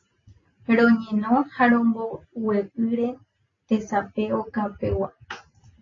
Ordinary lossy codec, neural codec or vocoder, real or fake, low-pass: AAC, 32 kbps; none; real; 7.2 kHz